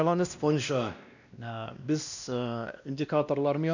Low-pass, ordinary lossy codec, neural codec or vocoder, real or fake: 7.2 kHz; none; codec, 16 kHz, 1 kbps, X-Codec, WavLM features, trained on Multilingual LibriSpeech; fake